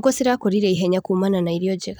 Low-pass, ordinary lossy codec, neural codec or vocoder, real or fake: none; none; vocoder, 44.1 kHz, 128 mel bands every 512 samples, BigVGAN v2; fake